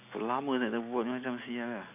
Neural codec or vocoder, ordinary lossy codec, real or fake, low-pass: none; AAC, 32 kbps; real; 3.6 kHz